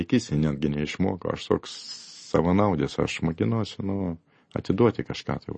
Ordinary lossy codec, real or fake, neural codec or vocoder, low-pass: MP3, 32 kbps; real; none; 10.8 kHz